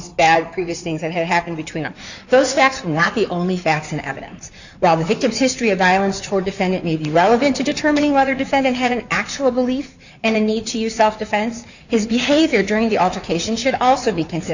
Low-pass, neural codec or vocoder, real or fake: 7.2 kHz; codec, 16 kHz in and 24 kHz out, 2.2 kbps, FireRedTTS-2 codec; fake